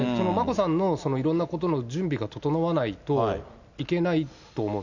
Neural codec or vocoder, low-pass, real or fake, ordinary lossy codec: none; 7.2 kHz; real; none